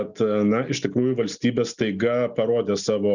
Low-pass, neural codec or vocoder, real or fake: 7.2 kHz; none; real